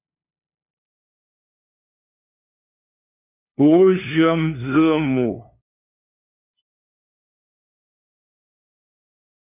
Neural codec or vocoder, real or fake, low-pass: codec, 16 kHz, 2 kbps, FunCodec, trained on LibriTTS, 25 frames a second; fake; 3.6 kHz